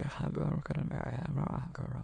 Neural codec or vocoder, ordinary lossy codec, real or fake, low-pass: autoencoder, 22.05 kHz, a latent of 192 numbers a frame, VITS, trained on many speakers; MP3, 96 kbps; fake; 9.9 kHz